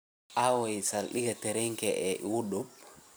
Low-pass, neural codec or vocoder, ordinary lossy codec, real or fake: none; vocoder, 44.1 kHz, 128 mel bands every 256 samples, BigVGAN v2; none; fake